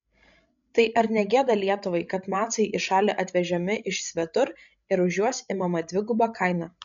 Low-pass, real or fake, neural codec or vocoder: 7.2 kHz; fake; codec, 16 kHz, 16 kbps, FreqCodec, larger model